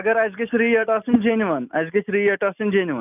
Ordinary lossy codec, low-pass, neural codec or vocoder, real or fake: none; 3.6 kHz; none; real